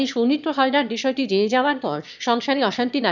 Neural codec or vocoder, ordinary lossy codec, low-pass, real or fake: autoencoder, 22.05 kHz, a latent of 192 numbers a frame, VITS, trained on one speaker; none; 7.2 kHz; fake